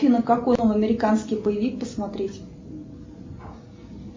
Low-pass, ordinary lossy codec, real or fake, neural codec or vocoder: 7.2 kHz; MP3, 32 kbps; real; none